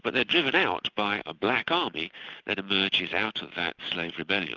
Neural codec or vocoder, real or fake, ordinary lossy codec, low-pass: none; real; Opus, 32 kbps; 7.2 kHz